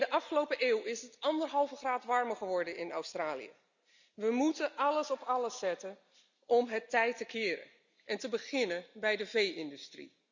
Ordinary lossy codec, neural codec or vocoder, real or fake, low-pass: none; none; real; 7.2 kHz